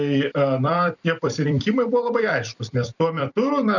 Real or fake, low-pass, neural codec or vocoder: fake; 7.2 kHz; vocoder, 44.1 kHz, 128 mel bands every 256 samples, BigVGAN v2